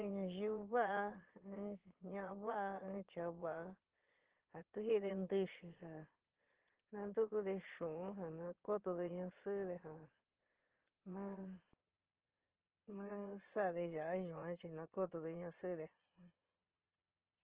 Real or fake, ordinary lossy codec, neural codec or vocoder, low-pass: fake; Opus, 16 kbps; vocoder, 22.05 kHz, 80 mel bands, Vocos; 3.6 kHz